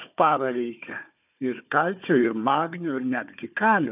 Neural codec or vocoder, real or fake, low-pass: codec, 16 kHz, 4 kbps, FunCodec, trained on Chinese and English, 50 frames a second; fake; 3.6 kHz